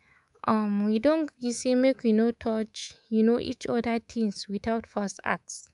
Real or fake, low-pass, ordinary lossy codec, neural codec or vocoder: fake; 10.8 kHz; none; codec, 24 kHz, 3.1 kbps, DualCodec